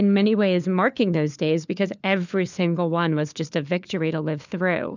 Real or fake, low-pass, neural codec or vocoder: fake; 7.2 kHz; codec, 16 kHz, 4 kbps, FunCodec, trained on LibriTTS, 50 frames a second